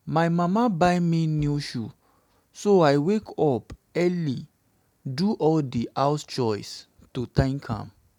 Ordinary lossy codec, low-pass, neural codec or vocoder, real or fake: none; 19.8 kHz; none; real